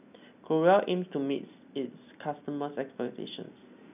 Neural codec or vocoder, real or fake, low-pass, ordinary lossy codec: none; real; 3.6 kHz; none